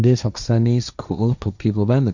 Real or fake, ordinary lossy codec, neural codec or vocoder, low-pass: fake; none; codec, 16 kHz, 1.1 kbps, Voila-Tokenizer; 7.2 kHz